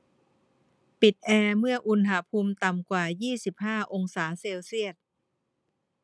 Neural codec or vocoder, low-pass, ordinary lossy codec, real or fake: none; none; none; real